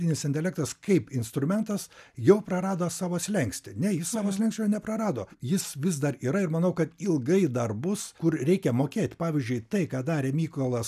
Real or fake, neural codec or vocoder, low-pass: real; none; 14.4 kHz